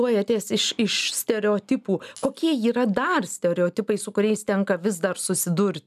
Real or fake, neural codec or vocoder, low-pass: real; none; 14.4 kHz